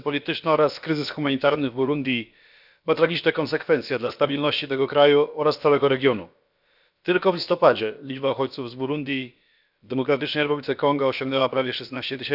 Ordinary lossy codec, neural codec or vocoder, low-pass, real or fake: none; codec, 16 kHz, about 1 kbps, DyCAST, with the encoder's durations; 5.4 kHz; fake